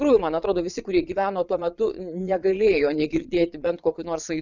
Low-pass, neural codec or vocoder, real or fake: 7.2 kHz; vocoder, 22.05 kHz, 80 mel bands, Vocos; fake